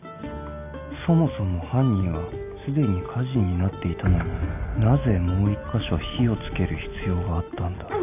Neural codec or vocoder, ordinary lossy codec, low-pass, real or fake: none; none; 3.6 kHz; real